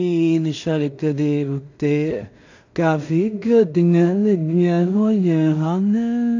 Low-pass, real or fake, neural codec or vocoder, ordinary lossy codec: 7.2 kHz; fake; codec, 16 kHz in and 24 kHz out, 0.4 kbps, LongCat-Audio-Codec, two codebook decoder; AAC, 48 kbps